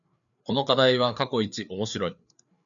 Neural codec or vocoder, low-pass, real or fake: codec, 16 kHz, 4 kbps, FreqCodec, larger model; 7.2 kHz; fake